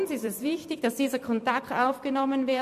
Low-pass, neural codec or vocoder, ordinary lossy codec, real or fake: 14.4 kHz; vocoder, 44.1 kHz, 128 mel bands every 256 samples, BigVGAN v2; MP3, 64 kbps; fake